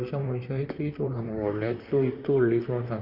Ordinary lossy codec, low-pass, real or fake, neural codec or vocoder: Opus, 64 kbps; 5.4 kHz; fake; vocoder, 44.1 kHz, 128 mel bands, Pupu-Vocoder